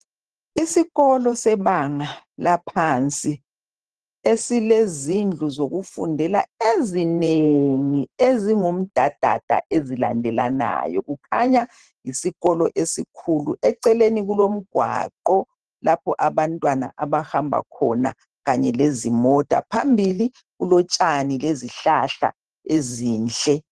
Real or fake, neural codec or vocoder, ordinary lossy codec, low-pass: fake; vocoder, 44.1 kHz, 128 mel bands every 512 samples, BigVGAN v2; Opus, 16 kbps; 10.8 kHz